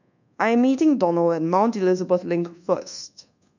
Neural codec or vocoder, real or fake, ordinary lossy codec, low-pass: codec, 24 kHz, 1.2 kbps, DualCodec; fake; none; 7.2 kHz